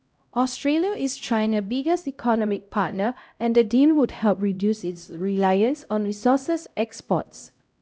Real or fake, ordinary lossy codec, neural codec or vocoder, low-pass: fake; none; codec, 16 kHz, 0.5 kbps, X-Codec, HuBERT features, trained on LibriSpeech; none